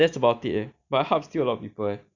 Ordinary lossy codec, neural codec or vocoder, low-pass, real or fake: none; none; 7.2 kHz; real